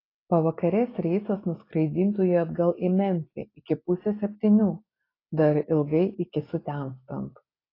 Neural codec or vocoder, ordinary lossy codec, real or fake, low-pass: none; AAC, 24 kbps; real; 5.4 kHz